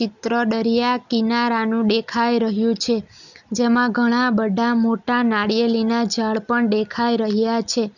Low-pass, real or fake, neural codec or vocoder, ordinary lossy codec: 7.2 kHz; real; none; none